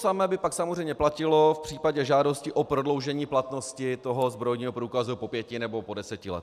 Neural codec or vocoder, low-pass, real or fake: none; 14.4 kHz; real